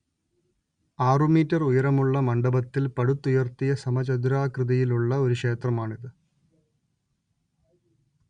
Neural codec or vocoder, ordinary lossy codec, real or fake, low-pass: none; Opus, 64 kbps; real; 9.9 kHz